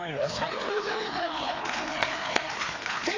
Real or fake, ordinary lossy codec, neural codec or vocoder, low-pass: fake; AAC, 32 kbps; codec, 16 kHz, 2 kbps, FreqCodec, larger model; 7.2 kHz